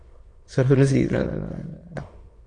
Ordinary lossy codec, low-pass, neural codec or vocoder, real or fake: AAC, 32 kbps; 9.9 kHz; autoencoder, 22.05 kHz, a latent of 192 numbers a frame, VITS, trained on many speakers; fake